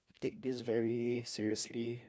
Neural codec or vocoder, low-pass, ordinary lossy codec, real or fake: codec, 16 kHz, 1 kbps, FunCodec, trained on LibriTTS, 50 frames a second; none; none; fake